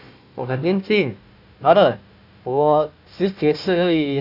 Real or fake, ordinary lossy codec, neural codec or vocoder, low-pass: fake; AAC, 48 kbps; codec, 16 kHz, 1 kbps, FunCodec, trained on Chinese and English, 50 frames a second; 5.4 kHz